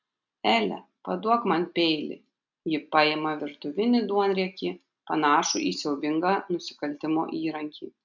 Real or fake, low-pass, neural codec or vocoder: real; 7.2 kHz; none